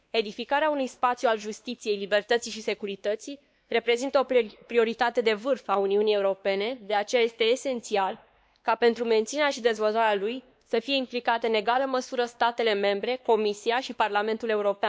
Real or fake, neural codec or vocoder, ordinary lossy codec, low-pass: fake; codec, 16 kHz, 2 kbps, X-Codec, WavLM features, trained on Multilingual LibriSpeech; none; none